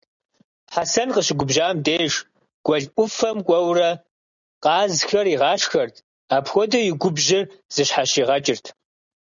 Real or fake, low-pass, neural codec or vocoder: real; 7.2 kHz; none